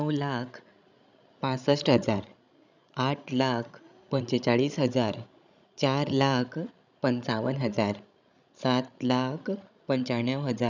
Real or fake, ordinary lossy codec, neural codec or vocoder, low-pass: fake; none; codec, 16 kHz, 16 kbps, FreqCodec, larger model; 7.2 kHz